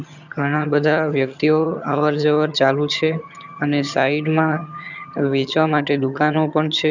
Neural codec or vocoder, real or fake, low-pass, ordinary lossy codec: vocoder, 22.05 kHz, 80 mel bands, HiFi-GAN; fake; 7.2 kHz; none